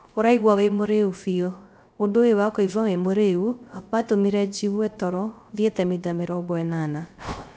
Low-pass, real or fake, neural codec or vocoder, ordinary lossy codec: none; fake; codec, 16 kHz, 0.3 kbps, FocalCodec; none